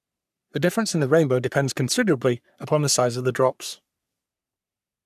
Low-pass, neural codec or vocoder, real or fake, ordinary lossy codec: 14.4 kHz; codec, 44.1 kHz, 3.4 kbps, Pupu-Codec; fake; none